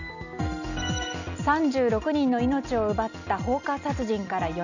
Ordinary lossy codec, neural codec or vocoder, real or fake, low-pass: none; none; real; 7.2 kHz